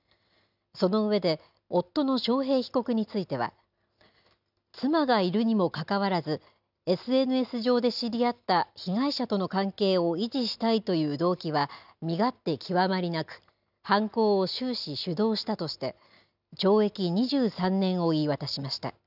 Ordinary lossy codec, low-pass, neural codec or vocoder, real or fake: none; 5.4 kHz; none; real